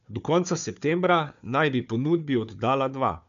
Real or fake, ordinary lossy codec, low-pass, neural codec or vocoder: fake; none; 7.2 kHz; codec, 16 kHz, 4 kbps, FunCodec, trained on Chinese and English, 50 frames a second